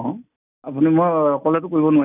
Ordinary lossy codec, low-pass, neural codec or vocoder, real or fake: none; 3.6 kHz; none; real